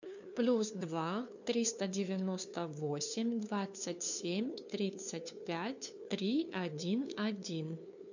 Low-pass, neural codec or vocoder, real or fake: 7.2 kHz; codec, 16 kHz, 2 kbps, FunCodec, trained on LibriTTS, 25 frames a second; fake